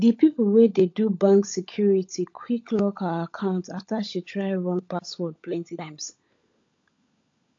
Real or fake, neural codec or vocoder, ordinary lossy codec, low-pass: fake; codec, 16 kHz, 16 kbps, FunCodec, trained on LibriTTS, 50 frames a second; AAC, 48 kbps; 7.2 kHz